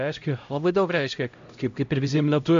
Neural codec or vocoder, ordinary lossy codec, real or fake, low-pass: codec, 16 kHz, 0.5 kbps, X-Codec, HuBERT features, trained on LibriSpeech; AAC, 64 kbps; fake; 7.2 kHz